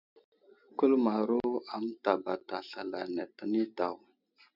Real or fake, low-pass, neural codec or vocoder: real; 5.4 kHz; none